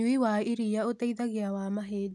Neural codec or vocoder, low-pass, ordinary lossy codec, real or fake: none; none; none; real